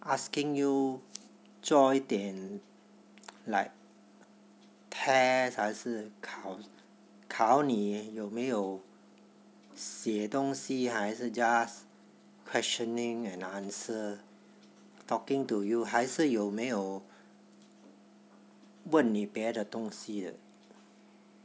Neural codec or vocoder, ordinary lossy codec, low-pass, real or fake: none; none; none; real